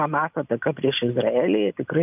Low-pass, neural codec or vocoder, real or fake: 3.6 kHz; none; real